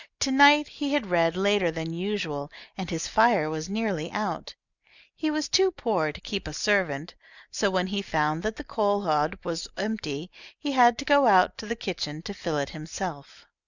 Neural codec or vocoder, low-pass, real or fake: none; 7.2 kHz; real